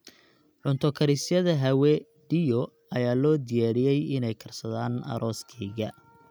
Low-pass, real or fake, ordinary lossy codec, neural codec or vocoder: none; real; none; none